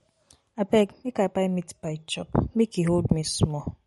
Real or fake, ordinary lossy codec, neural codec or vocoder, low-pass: real; MP3, 48 kbps; none; 19.8 kHz